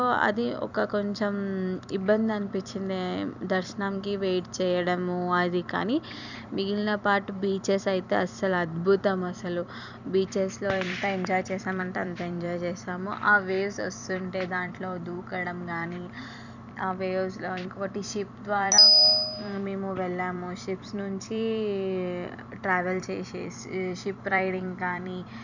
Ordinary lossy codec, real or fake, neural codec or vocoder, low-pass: none; fake; vocoder, 44.1 kHz, 128 mel bands every 256 samples, BigVGAN v2; 7.2 kHz